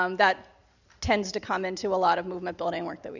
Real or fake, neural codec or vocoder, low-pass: real; none; 7.2 kHz